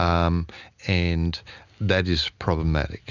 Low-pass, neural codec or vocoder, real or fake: 7.2 kHz; none; real